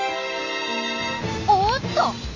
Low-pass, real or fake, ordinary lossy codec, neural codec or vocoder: 7.2 kHz; real; Opus, 64 kbps; none